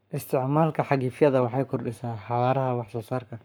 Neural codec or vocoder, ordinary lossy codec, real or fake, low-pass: codec, 44.1 kHz, 7.8 kbps, Pupu-Codec; none; fake; none